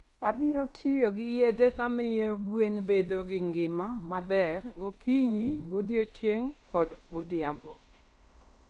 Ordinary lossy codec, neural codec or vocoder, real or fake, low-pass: none; codec, 16 kHz in and 24 kHz out, 0.9 kbps, LongCat-Audio-Codec, fine tuned four codebook decoder; fake; 10.8 kHz